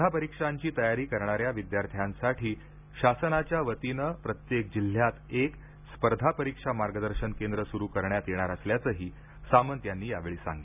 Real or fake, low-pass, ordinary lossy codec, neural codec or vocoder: real; 3.6 kHz; none; none